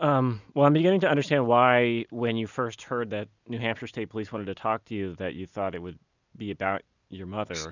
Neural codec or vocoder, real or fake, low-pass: none; real; 7.2 kHz